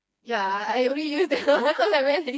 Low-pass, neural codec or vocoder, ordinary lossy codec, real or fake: none; codec, 16 kHz, 2 kbps, FreqCodec, smaller model; none; fake